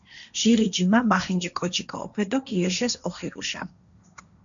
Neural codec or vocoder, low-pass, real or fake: codec, 16 kHz, 1.1 kbps, Voila-Tokenizer; 7.2 kHz; fake